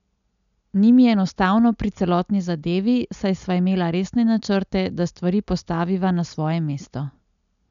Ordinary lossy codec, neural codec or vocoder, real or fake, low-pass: none; none; real; 7.2 kHz